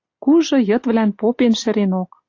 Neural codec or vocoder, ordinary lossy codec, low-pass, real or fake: none; AAC, 48 kbps; 7.2 kHz; real